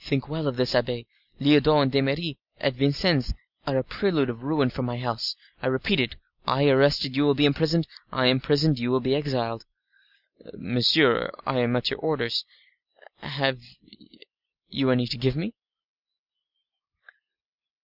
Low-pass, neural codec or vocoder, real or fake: 5.4 kHz; none; real